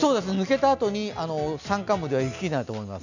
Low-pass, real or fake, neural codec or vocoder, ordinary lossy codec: 7.2 kHz; real; none; none